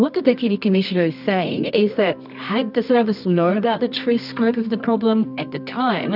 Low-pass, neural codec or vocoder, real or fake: 5.4 kHz; codec, 24 kHz, 0.9 kbps, WavTokenizer, medium music audio release; fake